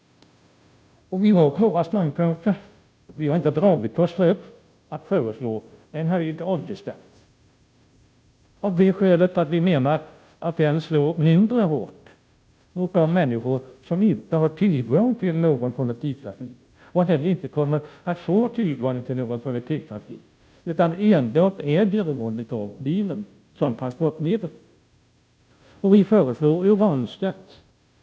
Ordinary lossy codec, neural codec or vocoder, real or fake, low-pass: none; codec, 16 kHz, 0.5 kbps, FunCodec, trained on Chinese and English, 25 frames a second; fake; none